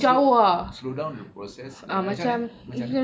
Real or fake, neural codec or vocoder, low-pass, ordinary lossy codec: real; none; none; none